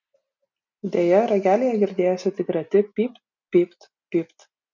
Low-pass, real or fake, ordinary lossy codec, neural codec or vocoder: 7.2 kHz; real; MP3, 64 kbps; none